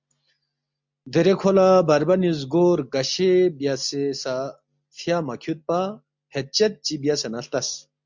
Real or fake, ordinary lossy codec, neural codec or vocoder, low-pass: real; MP3, 64 kbps; none; 7.2 kHz